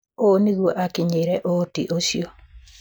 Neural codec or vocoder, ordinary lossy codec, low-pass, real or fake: none; none; none; real